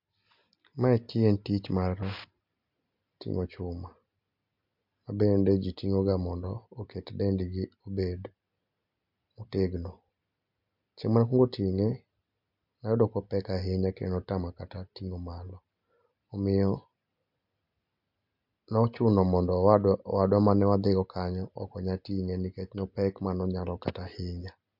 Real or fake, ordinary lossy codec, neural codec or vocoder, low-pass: real; MP3, 48 kbps; none; 5.4 kHz